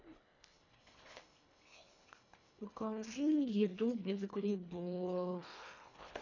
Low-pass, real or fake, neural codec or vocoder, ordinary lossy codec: 7.2 kHz; fake; codec, 24 kHz, 1.5 kbps, HILCodec; none